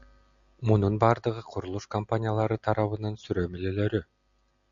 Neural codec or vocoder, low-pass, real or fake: none; 7.2 kHz; real